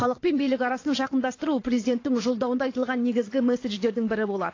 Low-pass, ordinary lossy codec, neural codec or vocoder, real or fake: 7.2 kHz; AAC, 32 kbps; vocoder, 22.05 kHz, 80 mel bands, WaveNeXt; fake